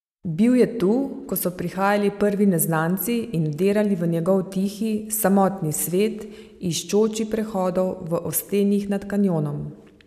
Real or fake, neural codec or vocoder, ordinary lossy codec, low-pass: real; none; none; 14.4 kHz